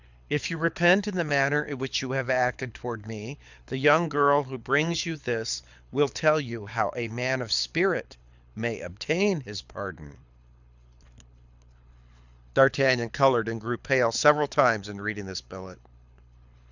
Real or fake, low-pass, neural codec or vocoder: fake; 7.2 kHz; codec, 24 kHz, 6 kbps, HILCodec